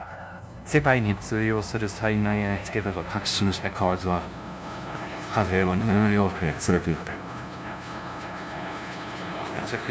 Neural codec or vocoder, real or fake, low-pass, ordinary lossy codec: codec, 16 kHz, 0.5 kbps, FunCodec, trained on LibriTTS, 25 frames a second; fake; none; none